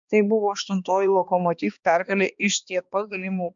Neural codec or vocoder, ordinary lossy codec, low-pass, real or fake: codec, 16 kHz, 2 kbps, X-Codec, HuBERT features, trained on balanced general audio; MP3, 64 kbps; 7.2 kHz; fake